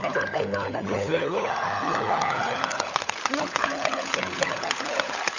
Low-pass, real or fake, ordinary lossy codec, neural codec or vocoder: 7.2 kHz; fake; none; codec, 16 kHz, 16 kbps, FunCodec, trained on LibriTTS, 50 frames a second